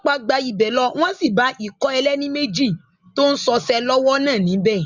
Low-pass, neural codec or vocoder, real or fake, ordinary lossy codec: 7.2 kHz; none; real; Opus, 64 kbps